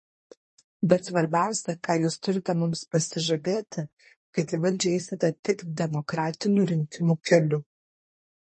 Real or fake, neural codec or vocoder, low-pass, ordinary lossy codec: fake; codec, 24 kHz, 1 kbps, SNAC; 10.8 kHz; MP3, 32 kbps